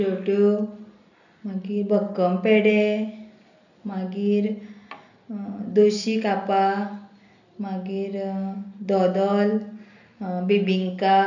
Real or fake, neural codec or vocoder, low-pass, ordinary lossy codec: real; none; 7.2 kHz; none